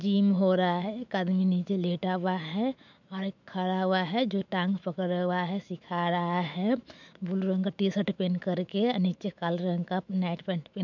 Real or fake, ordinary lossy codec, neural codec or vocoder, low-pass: fake; none; autoencoder, 48 kHz, 128 numbers a frame, DAC-VAE, trained on Japanese speech; 7.2 kHz